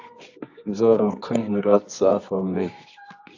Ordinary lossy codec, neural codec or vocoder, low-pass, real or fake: AAC, 48 kbps; codec, 24 kHz, 0.9 kbps, WavTokenizer, medium music audio release; 7.2 kHz; fake